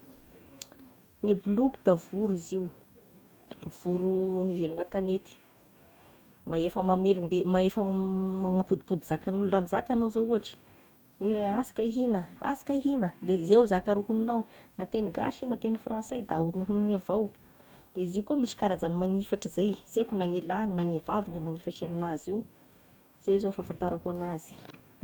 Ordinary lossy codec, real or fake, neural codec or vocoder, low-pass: none; fake; codec, 44.1 kHz, 2.6 kbps, DAC; 19.8 kHz